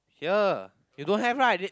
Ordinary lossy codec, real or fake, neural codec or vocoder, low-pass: none; real; none; none